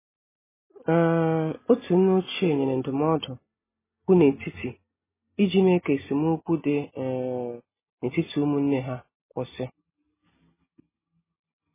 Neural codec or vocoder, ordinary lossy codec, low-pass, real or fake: none; MP3, 16 kbps; 3.6 kHz; real